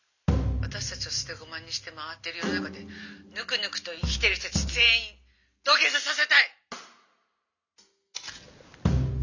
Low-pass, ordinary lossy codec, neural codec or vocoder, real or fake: 7.2 kHz; none; none; real